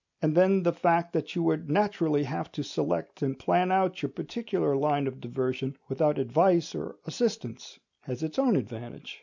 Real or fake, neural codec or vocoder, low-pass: real; none; 7.2 kHz